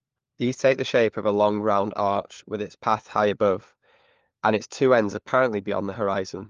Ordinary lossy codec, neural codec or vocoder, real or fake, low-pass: Opus, 32 kbps; codec, 16 kHz, 4 kbps, FunCodec, trained on LibriTTS, 50 frames a second; fake; 7.2 kHz